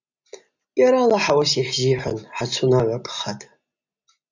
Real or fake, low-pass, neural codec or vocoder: real; 7.2 kHz; none